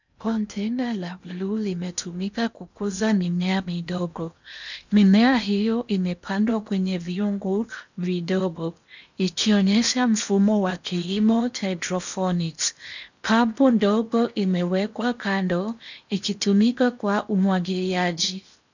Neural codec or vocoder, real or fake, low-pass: codec, 16 kHz in and 24 kHz out, 0.8 kbps, FocalCodec, streaming, 65536 codes; fake; 7.2 kHz